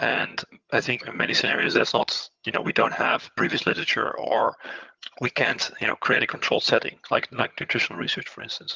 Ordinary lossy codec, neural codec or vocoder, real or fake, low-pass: Opus, 32 kbps; vocoder, 22.05 kHz, 80 mel bands, HiFi-GAN; fake; 7.2 kHz